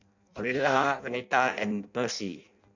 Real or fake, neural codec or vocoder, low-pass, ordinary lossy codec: fake; codec, 16 kHz in and 24 kHz out, 0.6 kbps, FireRedTTS-2 codec; 7.2 kHz; none